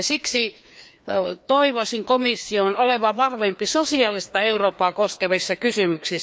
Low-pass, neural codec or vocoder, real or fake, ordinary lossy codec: none; codec, 16 kHz, 2 kbps, FreqCodec, larger model; fake; none